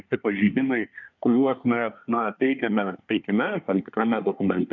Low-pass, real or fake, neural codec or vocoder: 7.2 kHz; fake; codec, 24 kHz, 1 kbps, SNAC